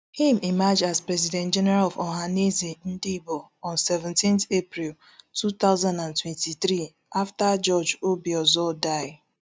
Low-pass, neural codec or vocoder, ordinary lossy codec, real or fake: none; none; none; real